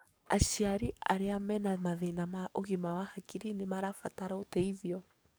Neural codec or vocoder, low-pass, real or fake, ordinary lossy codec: codec, 44.1 kHz, 7.8 kbps, DAC; none; fake; none